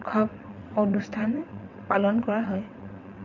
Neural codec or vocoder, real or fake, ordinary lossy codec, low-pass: vocoder, 22.05 kHz, 80 mel bands, WaveNeXt; fake; none; 7.2 kHz